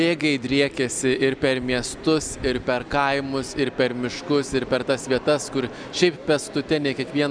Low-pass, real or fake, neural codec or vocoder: 9.9 kHz; real; none